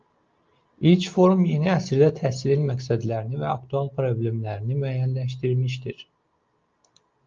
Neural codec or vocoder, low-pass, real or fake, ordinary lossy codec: none; 7.2 kHz; real; Opus, 24 kbps